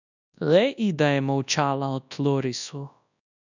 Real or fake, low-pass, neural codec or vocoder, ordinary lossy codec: fake; 7.2 kHz; codec, 24 kHz, 0.9 kbps, WavTokenizer, large speech release; none